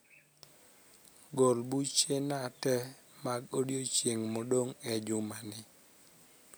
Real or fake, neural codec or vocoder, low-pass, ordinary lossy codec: real; none; none; none